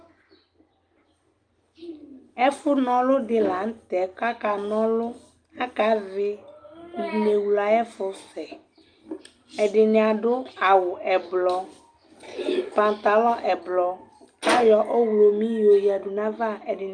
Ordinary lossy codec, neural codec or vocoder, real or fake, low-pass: Opus, 32 kbps; none; real; 9.9 kHz